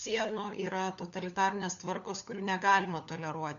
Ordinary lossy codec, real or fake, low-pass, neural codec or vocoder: MP3, 96 kbps; fake; 7.2 kHz; codec, 16 kHz, 4 kbps, FunCodec, trained on LibriTTS, 50 frames a second